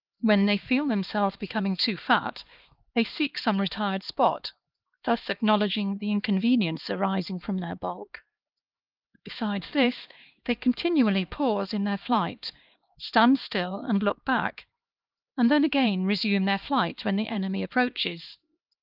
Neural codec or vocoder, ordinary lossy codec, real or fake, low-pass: codec, 16 kHz, 2 kbps, X-Codec, HuBERT features, trained on LibriSpeech; Opus, 24 kbps; fake; 5.4 kHz